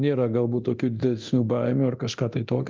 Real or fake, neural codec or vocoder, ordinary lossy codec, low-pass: fake; codec, 24 kHz, 0.9 kbps, DualCodec; Opus, 24 kbps; 7.2 kHz